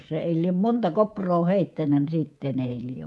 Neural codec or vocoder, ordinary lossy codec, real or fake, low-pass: codec, 24 kHz, 3.1 kbps, DualCodec; none; fake; none